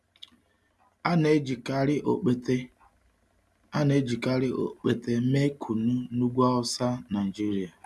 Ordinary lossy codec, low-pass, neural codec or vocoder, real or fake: none; none; none; real